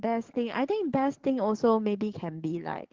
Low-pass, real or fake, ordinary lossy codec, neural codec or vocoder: 7.2 kHz; fake; Opus, 16 kbps; codec, 44.1 kHz, 7.8 kbps, DAC